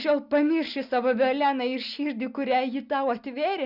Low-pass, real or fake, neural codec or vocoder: 5.4 kHz; real; none